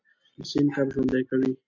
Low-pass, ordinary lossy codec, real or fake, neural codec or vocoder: 7.2 kHz; MP3, 48 kbps; real; none